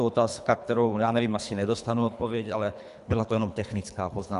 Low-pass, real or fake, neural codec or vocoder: 10.8 kHz; fake; codec, 24 kHz, 3 kbps, HILCodec